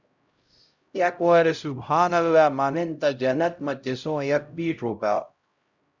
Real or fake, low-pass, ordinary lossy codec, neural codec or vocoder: fake; 7.2 kHz; Opus, 64 kbps; codec, 16 kHz, 0.5 kbps, X-Codec, HuBERT features, trained on LibriSpeech